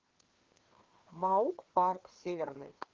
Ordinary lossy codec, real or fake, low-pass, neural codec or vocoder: Opus, 16 kbps; fake; 7.2 kHz; codec, 16 kHz, 2 kbps, FreqCodec, larger model